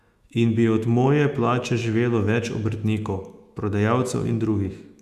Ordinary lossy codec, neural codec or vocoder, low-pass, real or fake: Opus, 64 kbps; autoencoder, 48 kHz, 128 numbers a frame, DAC-VAE, trained on Japanese speech; 14.4 kHz; fake